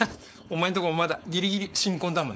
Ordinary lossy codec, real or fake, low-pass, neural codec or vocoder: none; fake; none; codec, 16 kHz, 4.8 kbps, FACodec